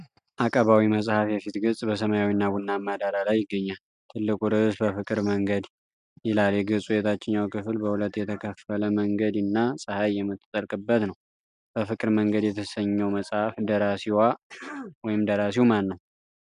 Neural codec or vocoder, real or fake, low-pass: none; real; 10.8 kHz